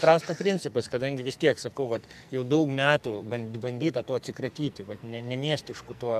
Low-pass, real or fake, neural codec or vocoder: 14.4 kHz; fake; codec, 44.1 kHz, 2.6 kbps, SNAC